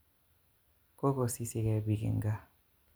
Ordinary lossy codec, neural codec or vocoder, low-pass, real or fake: none; none; none; real